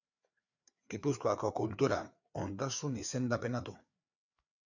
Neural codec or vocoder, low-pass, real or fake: codec, 16 kHz, 4 kbps, FreqCodec, larger model; 7.2 kHz; fake